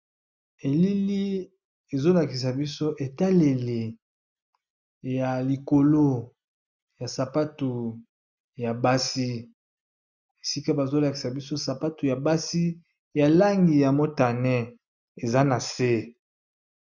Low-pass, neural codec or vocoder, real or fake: 7.2 kHz; none; real